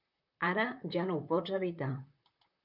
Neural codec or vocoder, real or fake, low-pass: vocoder, 44.1 kHz, 128 mel bands, Pupu-Vocoder; fake; 5.4 kHz